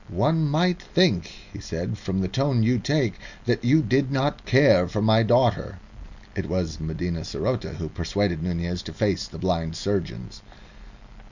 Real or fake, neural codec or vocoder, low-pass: real; none; 7.2 kHz